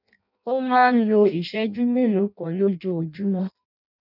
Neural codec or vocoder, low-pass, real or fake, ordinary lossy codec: codec, 16 kHz in and 24 kHz out, 0.6 kbps, FireRedTTS-2 codec; 5.4 kHz; fake; none